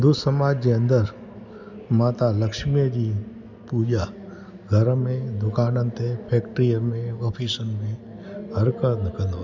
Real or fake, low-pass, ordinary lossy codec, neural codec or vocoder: real; 7.2 kHz; none; none